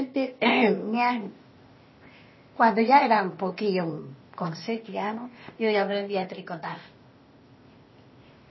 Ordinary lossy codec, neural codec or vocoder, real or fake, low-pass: MP3, 24 kbps; codec, 16 kHz, 0.8 kbps, ZipCodec; fake; 7.2 kHz